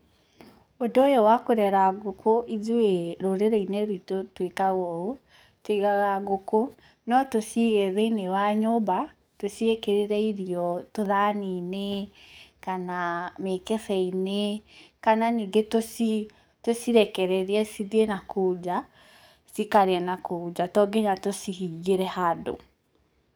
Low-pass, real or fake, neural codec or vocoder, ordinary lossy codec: none; fake; codec, 44.1 kHz, 7.8 kbps, DAC; none